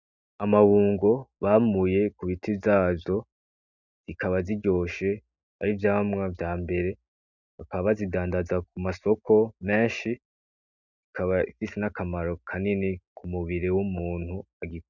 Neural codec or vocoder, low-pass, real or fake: none; 7.2 kHz; real